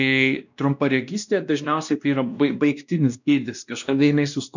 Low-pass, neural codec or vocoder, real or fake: 7.2 kHz; codec, 16 kHz, 1 kbps, X-Codec, WavLM features, trained on Multilingual LibriSpeech; fake